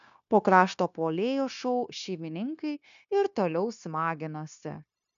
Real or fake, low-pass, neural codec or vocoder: fake; 7.2 kHz; codec, 16 kHz, 0.9 kbps, LongCat-Audio-Codec